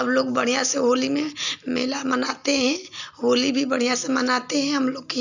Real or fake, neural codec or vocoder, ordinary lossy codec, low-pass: real; none; none; 7.2 kHz